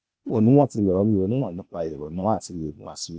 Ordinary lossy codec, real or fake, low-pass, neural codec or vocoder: none; fake; none; codec, 16 kHz, 0.8 kbps, ZipCodec